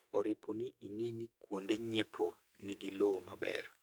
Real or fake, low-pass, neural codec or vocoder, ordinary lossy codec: fake; none; codec, 44.1 kHz, 2.6 kbps, SNAC; none